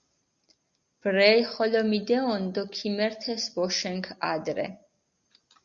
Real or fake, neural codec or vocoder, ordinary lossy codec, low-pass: real; none; Opus, 64 kbps; 7.2 kHz